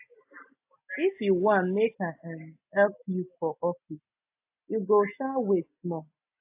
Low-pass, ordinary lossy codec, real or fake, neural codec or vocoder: 3.6 kHz; AAC, 32 kbps; real; none